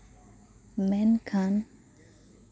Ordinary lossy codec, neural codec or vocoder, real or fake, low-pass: none; none; real; none